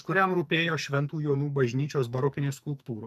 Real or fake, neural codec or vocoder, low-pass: fake; codec, 44.1 kHz, 2.6 kbps, SNAC; 14.4 kHz